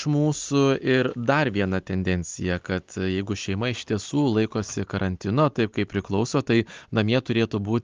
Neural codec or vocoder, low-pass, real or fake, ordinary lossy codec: none; 7.2 kHz; real; Opus, 32 kbps